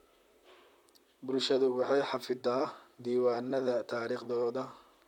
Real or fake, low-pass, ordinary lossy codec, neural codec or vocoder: fake; 19.8 kHz; none; vocoder, 44.1 kHz, 128 mel bands, Pupu-Vocoder